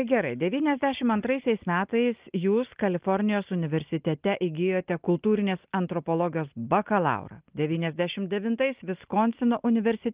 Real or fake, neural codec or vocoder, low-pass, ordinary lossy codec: real; none; 3.6 kHz; Opus, 32 kbps